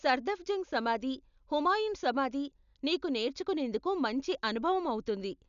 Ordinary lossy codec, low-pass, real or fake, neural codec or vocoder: none; 7.2 kHz; real; none